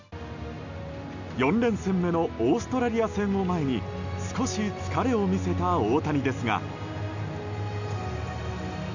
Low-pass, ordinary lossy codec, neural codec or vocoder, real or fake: 7.2 kHz; none; none; real